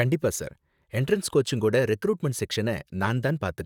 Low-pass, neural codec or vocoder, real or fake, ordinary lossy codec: 19.8 kHz; vocoder, 44.1 kHz, 128 mel bands, Pupu-Vocoder; fake; none